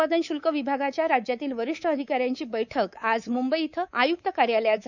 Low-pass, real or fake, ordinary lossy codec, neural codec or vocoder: 7.2 kHz; fake; none; codec, 24 kHz, 3.1 kbps, DualCodec